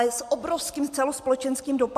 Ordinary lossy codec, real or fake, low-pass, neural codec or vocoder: AAC, 96 kbps; real; 14.4 kHz; none